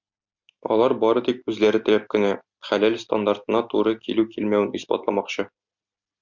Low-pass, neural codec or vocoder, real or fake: 7.2 kHz; none; real